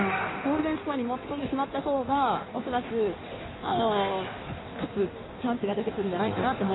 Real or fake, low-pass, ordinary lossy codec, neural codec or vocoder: fake; 7.2 kHz; AAC, 16 kbps; codec, 16 kHz in and 24 kHz out, 1.1 kbps, FireRedTTS-2 codec